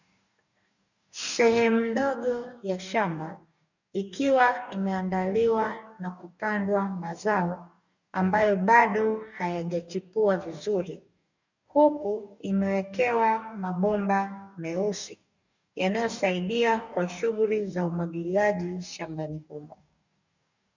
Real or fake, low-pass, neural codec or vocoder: fake; 7.2 kHz; codec, 44.1 kHz, 2.6 kbps, DAC